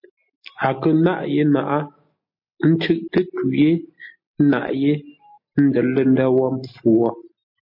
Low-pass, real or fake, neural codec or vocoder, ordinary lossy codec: 5.4 kHz; real; none; MP3, 32 kbps